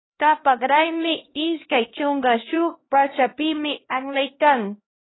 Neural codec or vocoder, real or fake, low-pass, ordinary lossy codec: codec, 16 kHz, 1 kbps, X-Codec, HuBERT features, trained on LibriSpeech; fake; 7.2 kHz; AAC, 16 kbps